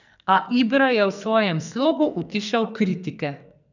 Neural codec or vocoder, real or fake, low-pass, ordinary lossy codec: codec, 44.1 kHz, 2.6 kbps, SNAC; fake; 7.2 kHz; none